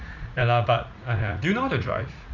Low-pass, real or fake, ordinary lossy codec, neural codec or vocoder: 7.2 kHz; fake; none; vocoder, 44.1 kHz, 80 mel bands, Vocos